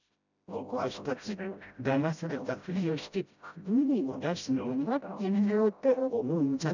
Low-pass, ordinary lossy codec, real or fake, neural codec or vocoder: 7.2 kHz; none; fake; codec, 16 kHz, 0.5 kbps, FreqCodec, smaller model